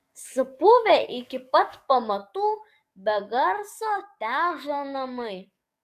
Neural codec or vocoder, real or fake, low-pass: codec, 44.1 kHz, 7.8 kbps, DAC; fake; 14.4 kHz